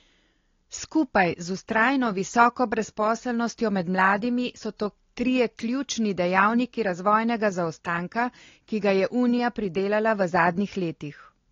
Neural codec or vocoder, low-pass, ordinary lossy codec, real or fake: none; 7.2 kHz; AAC, 32 kbps; real